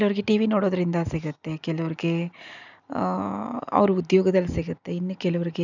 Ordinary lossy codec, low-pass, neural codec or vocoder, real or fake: none; 7.2 kHz; none; real